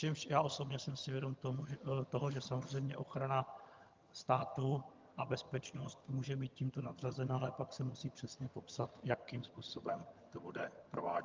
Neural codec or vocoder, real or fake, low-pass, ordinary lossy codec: vocoder, 22.05 kHz, 80 mel bands, HiFi-GAN; fake; 7.2 kHz; Opus, 24 kbps